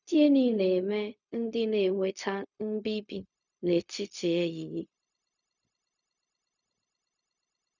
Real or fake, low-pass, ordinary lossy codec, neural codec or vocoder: fake; 7.2 kHz; none; codec, 16 kHz, 0.4 kbps, LongCat-Audio-Codec